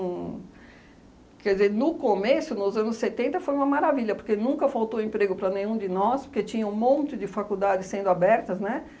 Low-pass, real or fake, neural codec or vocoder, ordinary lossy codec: none; real; none; none